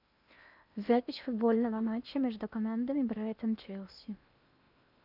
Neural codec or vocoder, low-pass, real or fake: codec, 16 kHz in and 24 kHz out, 0.8 kbps, FocalCodec, streaming, 65536 codes; 5.4 kHz; fake